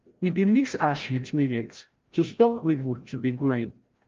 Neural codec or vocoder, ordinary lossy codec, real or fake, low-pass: codec, 16 kHz, 0.5 kbps, FreqCodec, larger model; Opus, 32 kbps; fake; 7.2 kHz